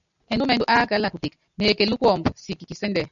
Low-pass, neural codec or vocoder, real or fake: 7.2 kHz; none; real